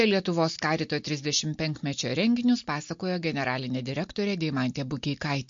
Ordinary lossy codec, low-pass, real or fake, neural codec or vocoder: MP3, 48 kbps; 7.2 kHz; real; none